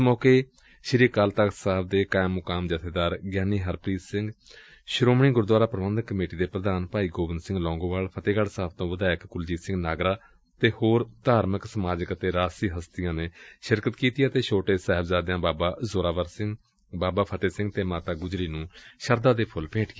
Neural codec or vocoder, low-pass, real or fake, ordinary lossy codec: none; none; real; none